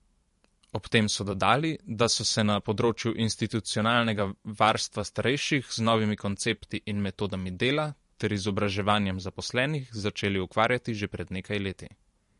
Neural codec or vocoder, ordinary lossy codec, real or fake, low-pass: vocoder, 44.1 kHz, 128 mel bands every 512 samples, BigVGAN v2; MP3, 48 kbps; fake; 14.4 kHz